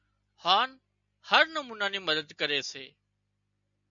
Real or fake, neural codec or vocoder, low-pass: real; none; 7.2 kHz